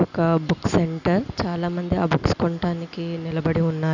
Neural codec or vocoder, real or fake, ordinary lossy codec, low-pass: none; real; none; 7.2 kHz